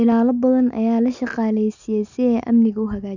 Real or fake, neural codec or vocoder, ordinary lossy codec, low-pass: real; none; none; 7.2 kHz